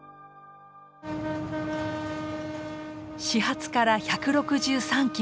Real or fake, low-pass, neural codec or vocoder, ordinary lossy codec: real; none; none; none